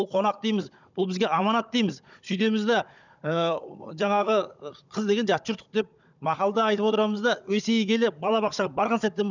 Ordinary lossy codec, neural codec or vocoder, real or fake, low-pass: none; codec, 16 kHz, 16 kbps, FunCodec, trained on Chinese and English, 50 frames a second; fake; 7.2 kHz